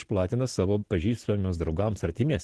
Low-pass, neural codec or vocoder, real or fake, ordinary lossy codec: 10.8 kHz; none; real; Opus, 16 kbps